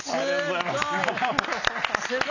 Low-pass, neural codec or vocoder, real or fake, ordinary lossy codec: 7.2 kHz; none; real; none